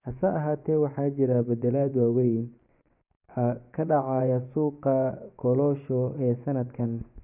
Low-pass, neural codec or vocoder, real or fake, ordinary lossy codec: 3.6 kHz; vocoder, 24 kHz, 100 mel bands, Vocos; fake; none